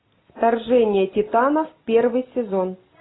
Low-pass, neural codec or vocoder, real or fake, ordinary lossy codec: 7.2 kHz; none; real; AAC, 16 kbps